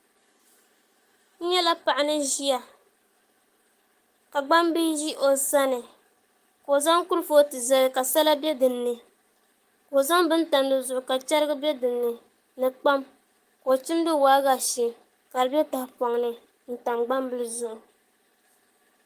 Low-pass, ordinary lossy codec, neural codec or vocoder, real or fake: 14.4 kHz; Opus, 32 kbps; codec, 44.1 kHz, 7.8 kbps, Pupu-Codec; fake